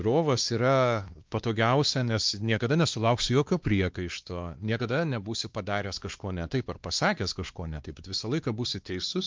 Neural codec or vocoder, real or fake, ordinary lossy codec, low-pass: codec, 16 kHz, 2 kbps, X-Codec, WavLM features, trained on Multilingual LibriSpeech; fake; Opus, 32 kbps; 7.2 kHz